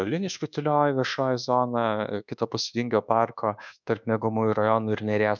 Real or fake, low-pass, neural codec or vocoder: fake; 7.2 kHz; codec, 24 kHz, 1.2 kbps, DualCodec